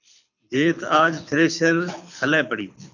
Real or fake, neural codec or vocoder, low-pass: fake; codec, 24 kHz, 6 kbps, HILCodec; 7.2 kHz